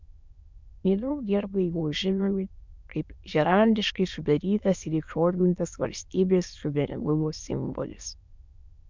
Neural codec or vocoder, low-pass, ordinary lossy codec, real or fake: autoencoder, 22.05 kHz, a latent of 192 numbers a frame, VITS, trained on many speakers; 7.2 kHz; MP3, 64 kbps; fake